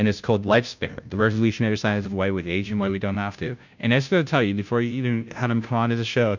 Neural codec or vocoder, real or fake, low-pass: codec, 16 kHz, 0.5 kbps, FunCodec, trained on Chinese and English, 25 frames a second; fake; 7.2 kHz